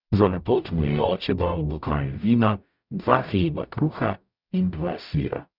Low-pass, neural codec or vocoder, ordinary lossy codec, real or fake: 5.4 kHz; codec, 44.1 kHz, 0.9 kbps, DAC; none; fake